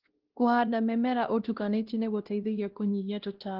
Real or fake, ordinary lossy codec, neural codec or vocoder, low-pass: fake; Opus, 16 kbps; codec, 16 kHz, 1 kbps, X-Codec, WavLM features, trained on Multilingual LibriSpeech; 5.4 kHz